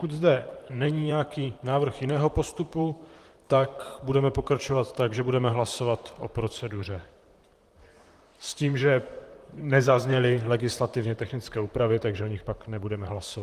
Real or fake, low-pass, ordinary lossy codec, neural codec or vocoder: fake; 14.4 kHz; Opus, 24 kbps; vocoder, 44.1 kHz, 128 mel bands, Pupu-Vocoder